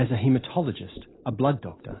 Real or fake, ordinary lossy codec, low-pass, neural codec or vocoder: real; AAC, 16 kbps; 7.2 kHz; none